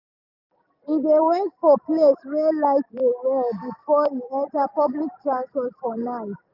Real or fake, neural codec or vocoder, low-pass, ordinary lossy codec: real; none; 5.4 kHz; none